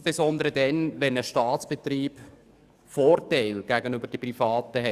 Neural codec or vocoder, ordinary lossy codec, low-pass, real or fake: codec, 44.1 kHz, 7.8 kbps, DAC; Opus, 64 kbps; 14.4 kHz; fake